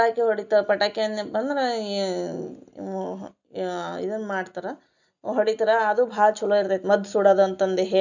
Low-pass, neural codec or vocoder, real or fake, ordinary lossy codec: 7.2 kHz; none; real; none